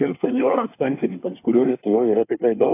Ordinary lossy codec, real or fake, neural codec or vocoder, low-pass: AAC, 24 kbps; fake; codec, 24 kHz, 1 kbps, SNAC; 3.6 kHz